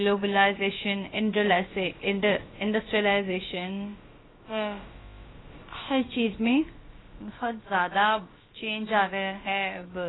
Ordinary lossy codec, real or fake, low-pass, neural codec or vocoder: AAC, 16 kbps; fake; 7.2 kHz; codec, 16 kHz, about 1 kbps, DyCAST, with the encoder's durations